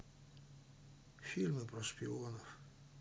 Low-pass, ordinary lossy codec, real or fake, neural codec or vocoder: none; none; real; none